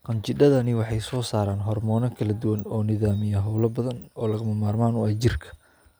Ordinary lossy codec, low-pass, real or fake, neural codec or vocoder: none; none; real; none